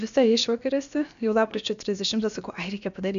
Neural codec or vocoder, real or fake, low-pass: codec, 16 kHz, about 1 kbps, DyCAST, with the encoder's durations; fake; 7.2 kHz